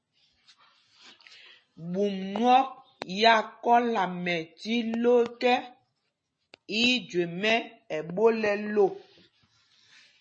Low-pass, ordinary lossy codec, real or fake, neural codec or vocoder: 9.9 kHz; MP3, 32 kbps; real; none